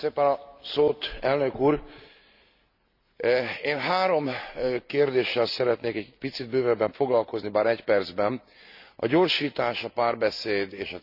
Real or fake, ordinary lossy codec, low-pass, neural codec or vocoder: real; none; 5.4 kHz; none